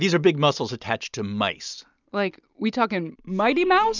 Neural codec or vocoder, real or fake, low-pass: none; real; 7.2 kHz